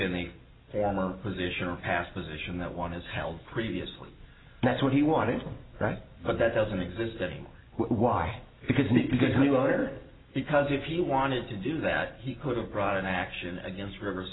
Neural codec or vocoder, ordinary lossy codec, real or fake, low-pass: none; AAC, 16 kbps; real; 7.2 kHz